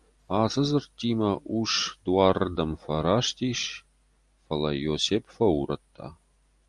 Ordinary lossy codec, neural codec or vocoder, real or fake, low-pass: Opus, 32 kbps; none; real; 10.8 kHz